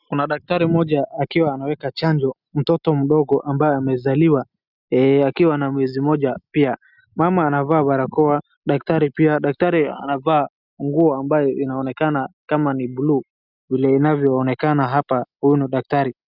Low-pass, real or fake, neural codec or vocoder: 5.4 kHz; real; none